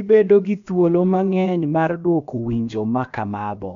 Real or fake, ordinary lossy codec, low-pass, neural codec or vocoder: fake; none; 7.2 kHz; codec, 16 kHz, about 1 kbps, DyCAST, with the encoder's durations